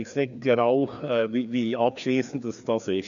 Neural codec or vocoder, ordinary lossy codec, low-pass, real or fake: codec, 16 kHz, 2 kbps, FreqCodec, larger model; none; 7.2 kHz; fake